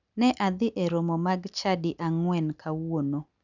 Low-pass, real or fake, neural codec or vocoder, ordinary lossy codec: 7.2 kHz; real; none; none